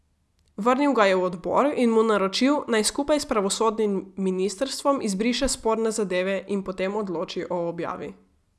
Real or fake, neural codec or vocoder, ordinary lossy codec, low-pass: real; none; none; none